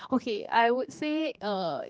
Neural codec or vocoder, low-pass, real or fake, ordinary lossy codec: codec, 16 kHz, 2 kbps, X-Codec, HuBERT features, trained on general audio; none; fake; none